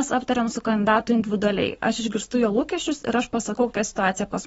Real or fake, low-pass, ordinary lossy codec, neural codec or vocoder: fake; 19.8 kHz; AAC, 24 kbps; codec, 44.1 kHz, 7.8 kbps, Pupu-Codec